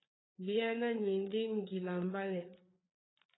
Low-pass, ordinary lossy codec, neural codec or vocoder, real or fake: 7.2 kHz; AAC, 16 kbps; codec, 16 kHz, 4 kbps, FreqCodec, larger model; fake